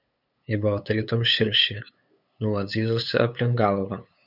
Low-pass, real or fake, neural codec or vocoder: 5.4 kHz; fake; codec, 16 kHz, 8 kbps, FunCodec, trained on LibriTTS, 25 frames a second